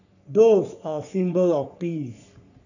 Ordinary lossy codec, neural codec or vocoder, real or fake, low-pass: none; codec, 44.1 kHz, 3.4 kbps, Pupu-Codec; fake; 7.2 kHz